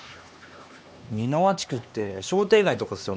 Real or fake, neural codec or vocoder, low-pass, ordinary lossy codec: fake; codec, 16 kHz, 2 kbps, X-Codec, HuBERT features, trained on LibriSpeech; none; none